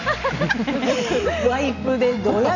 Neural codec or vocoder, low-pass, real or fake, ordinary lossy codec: none; 7.2 kHz; real; none